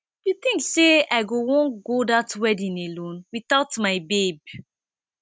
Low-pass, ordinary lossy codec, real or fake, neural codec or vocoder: none; none; real; none